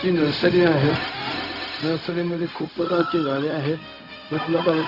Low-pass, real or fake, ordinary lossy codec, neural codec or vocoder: 5.4 kHz; fake; Opus, 64 kbps; codec, 16 kHz, 0.4 kbps, LongCat-Audio-Codec